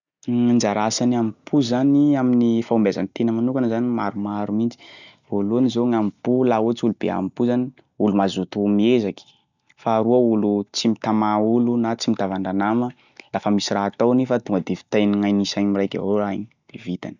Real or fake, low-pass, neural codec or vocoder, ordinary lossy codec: real; 7.2 kHz; none; none